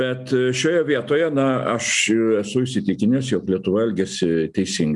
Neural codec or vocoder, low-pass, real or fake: none; 10.8 kHz; real